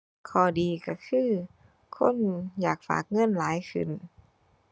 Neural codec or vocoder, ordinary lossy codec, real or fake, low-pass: none; none; real; none